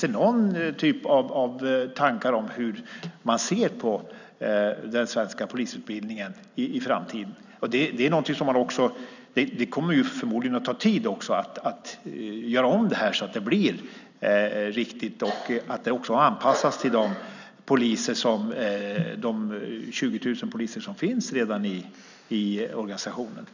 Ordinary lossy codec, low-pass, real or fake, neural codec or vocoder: none; 7.2 kHz; real; none